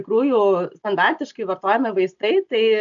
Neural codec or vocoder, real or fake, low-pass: none; real; 7.2 kHz